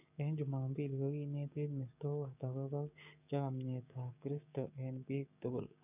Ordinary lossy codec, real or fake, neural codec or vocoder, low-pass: none; fake; codec, 16 kHz, 6 kbps, DAC; 3.6 kHz